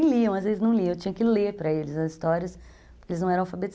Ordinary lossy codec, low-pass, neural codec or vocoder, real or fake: none; none; none; real